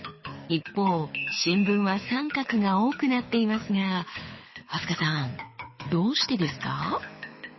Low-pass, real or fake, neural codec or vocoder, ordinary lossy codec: 7.2 kHz; fake; codec, 24 kHz, 6 kbps, HILCodec; MP3, 24 kbps